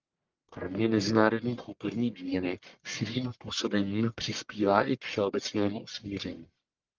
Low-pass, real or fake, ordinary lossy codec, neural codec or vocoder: 7.2 kHz; fake; Opus, 32 kbps; codec, 44.1 kHz, 1.7 kbps, Pupu-Codec